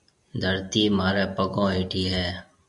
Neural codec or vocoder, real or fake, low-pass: none; real; 10.8 kHz